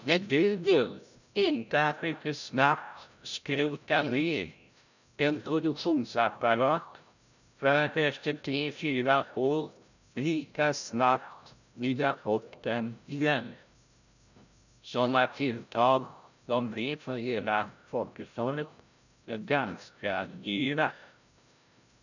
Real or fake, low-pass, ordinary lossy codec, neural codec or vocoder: fake; 7.2 kHz; none; codec, 16 kHz, 0.5 kbps, FreqCodec, larger model